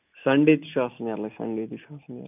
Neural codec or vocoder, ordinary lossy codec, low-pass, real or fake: none; none; 3.6 kHz; real